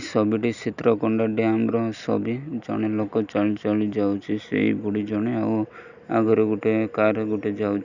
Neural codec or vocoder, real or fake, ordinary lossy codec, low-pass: none; real; none; 7.2 kHz